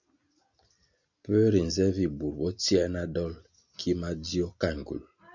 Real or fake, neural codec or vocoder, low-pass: real; none; 7.2 kHz